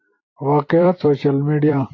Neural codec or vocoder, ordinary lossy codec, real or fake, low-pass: vocoder, 44.1 kHz, 128 mel bands every 256 samples, BigVGAN v2; AAC, 32 kbps; fake; 7.2 kHz